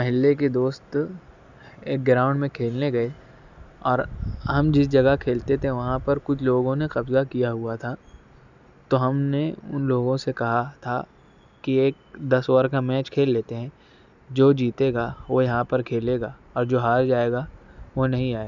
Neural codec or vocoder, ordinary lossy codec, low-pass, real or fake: none; none; 7.2 kHz; real